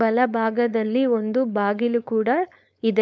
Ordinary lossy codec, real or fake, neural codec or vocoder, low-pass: none; fake; codec, 16 kHz, 4.8 kbps, FACodec; none